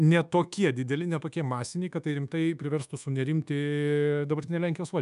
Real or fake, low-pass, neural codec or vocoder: fake; 10.8 kHz; codec, 24 kHz, 1.2 kbps, DualCodec